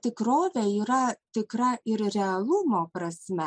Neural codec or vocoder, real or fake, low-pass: none; real; 9.9 kHz